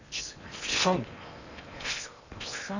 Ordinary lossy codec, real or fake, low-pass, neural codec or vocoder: none; fake; 7.2 kHz; codec, 16 kHz in and 24 kHz out, 0.8 kbps, FocalCodec, streaming, 65536 codes